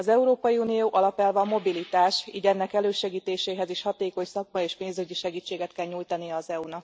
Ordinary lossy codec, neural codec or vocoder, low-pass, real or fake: none; none; none; real